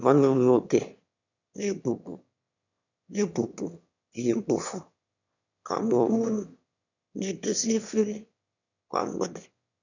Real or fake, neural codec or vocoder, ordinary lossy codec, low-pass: fake; autoencoder, 22.05 kHz, a latent of 192 numbers a frame, VITS, trained on one speaker; none; 7.2 kHz